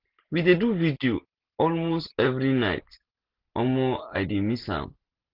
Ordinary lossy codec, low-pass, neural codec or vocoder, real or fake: Opus, 16 kbps; 5.4 kHz; none; real